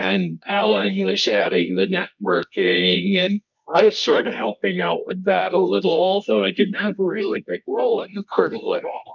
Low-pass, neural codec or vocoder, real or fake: 7.2 kHz; codec, 24 kHz, 0.9 kbps, WavTokenizer, medium music audio release; fake